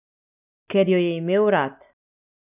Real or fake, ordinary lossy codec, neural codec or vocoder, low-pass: real; none; none; 3.6 kHz